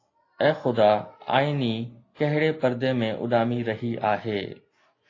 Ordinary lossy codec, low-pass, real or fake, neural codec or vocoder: AAC, 32 kbps; 7.2 kHz; real; none